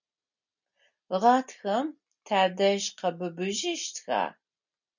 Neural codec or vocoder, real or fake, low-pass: none; real; 7.2 kHz